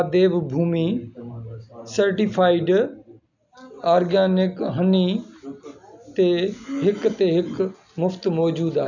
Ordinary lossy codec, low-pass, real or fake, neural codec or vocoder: none; 7.2 kHz; real; none